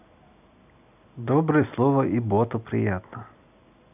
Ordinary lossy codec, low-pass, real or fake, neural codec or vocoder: none; 3.6 kHz; real; none